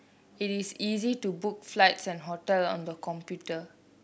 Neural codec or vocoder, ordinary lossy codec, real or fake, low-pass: none; none; real; none